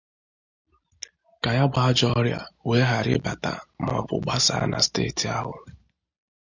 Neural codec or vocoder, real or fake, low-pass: none; real; 7.2 kHz